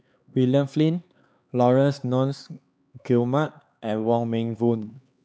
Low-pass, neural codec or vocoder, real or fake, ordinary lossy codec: none; codec, 16 kHz, 4 kbps, X-Codec, HuBERT features, trained on LibriSpeech; fake; none